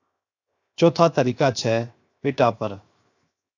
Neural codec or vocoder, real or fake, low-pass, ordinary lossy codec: codec, 16 kHz, 0.7 kbps, FocalCodec; fake; 7.2 kHz; AAC, 48 kbps